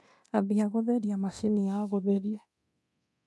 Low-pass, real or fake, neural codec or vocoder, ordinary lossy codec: none; fake; codec, 24 kHz, 0.9 kbps, DualCodec; none